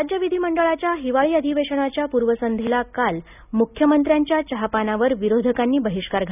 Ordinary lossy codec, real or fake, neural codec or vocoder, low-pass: none; real; none; 3.6 kHz